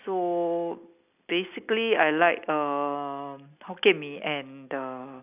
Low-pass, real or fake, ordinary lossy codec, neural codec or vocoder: 3.6 kHz; real; none; none